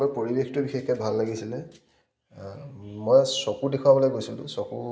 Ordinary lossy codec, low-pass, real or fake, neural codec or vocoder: none; none; real; none